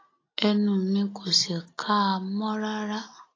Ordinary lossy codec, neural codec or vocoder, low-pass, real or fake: AAC, 32 kbps; none; 7.2 kHz; real